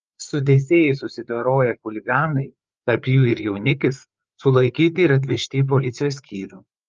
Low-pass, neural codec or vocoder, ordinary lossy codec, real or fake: 7.2 kHz; codec, 16 kHz, 4 kbps, FreqCodec, larger model; Opus, 24 kbps; fake